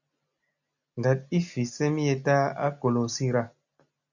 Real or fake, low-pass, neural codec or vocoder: real; 7.2 kHz; none